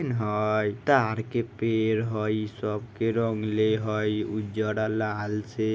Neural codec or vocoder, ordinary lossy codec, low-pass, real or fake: none; none; none; real